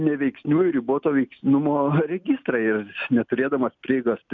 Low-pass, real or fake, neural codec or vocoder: 7.2 kHz; real; none